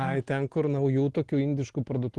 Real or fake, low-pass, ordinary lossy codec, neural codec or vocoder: real; 10.8 kHz; Opus, 16 kbps; none